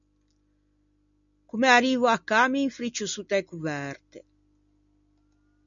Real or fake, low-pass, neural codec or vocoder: real; 7.2 kHz; none